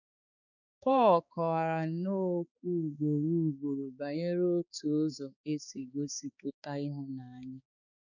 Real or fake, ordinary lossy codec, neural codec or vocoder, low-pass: fake; none; codec, 16 kHz, 4 kbps, X-Codec, HuBERT features, trained on balanced general audio; 7.2 kHz